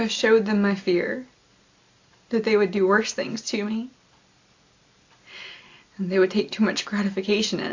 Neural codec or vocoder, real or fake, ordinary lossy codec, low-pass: none; real; AAC, 48 kbps; 7.2 kHz